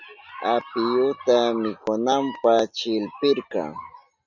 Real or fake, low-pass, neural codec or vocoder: real; 7.2 kHz; none